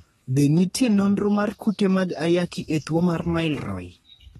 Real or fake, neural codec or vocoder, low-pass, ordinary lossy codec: fake; codec, 32 kHz, 1.9 kbps, SNAC; 14.4 kHz; AAC, 32 kbps